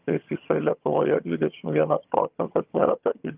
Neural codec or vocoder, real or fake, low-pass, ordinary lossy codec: vocoder, 22.05 kHz, 80 mel bands, HiFi-GAN; fake; 3.6 kHz; Opus, 32 kbps